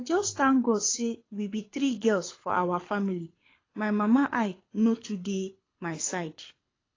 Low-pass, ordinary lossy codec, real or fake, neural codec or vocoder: 7.2 kHz; AAC, 32 kbps; fake; codec, 24 kHz, 6 kbps, HILCodec